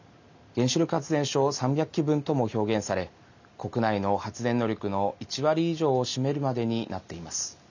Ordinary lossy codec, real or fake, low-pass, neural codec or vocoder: none; real; 7.2 kHz; none